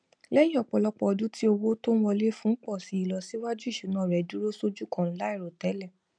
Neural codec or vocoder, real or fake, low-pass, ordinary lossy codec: none; real; 9.9 kHz; none